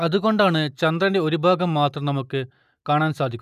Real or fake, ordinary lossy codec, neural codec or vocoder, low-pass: real; none; none; 14.4 kHz